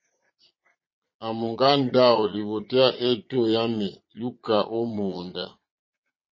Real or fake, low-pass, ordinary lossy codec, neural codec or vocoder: fake; 7.2 kHz; MP3, 32 kbps; vocoder, 22.05 kHz, 80 mel bands, Vocos